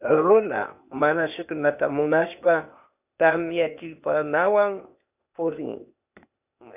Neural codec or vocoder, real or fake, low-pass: codec, 16 kHz, 0.8 kbps, ZipCodec; fake; 3.6 kHz